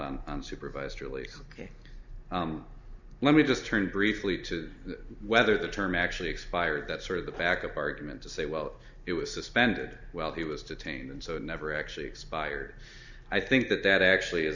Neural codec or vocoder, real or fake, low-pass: none; real; 7.2 kHz